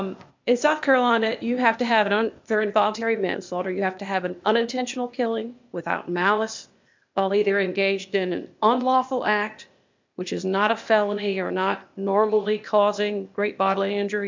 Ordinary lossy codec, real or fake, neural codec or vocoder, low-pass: MP3, 64 kbps; fake; codec, 16 kHz, 0.8 kbps, ZipCodec; 7.2 kHz